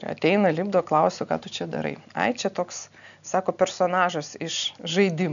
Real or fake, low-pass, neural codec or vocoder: real; 7.2 kHz; none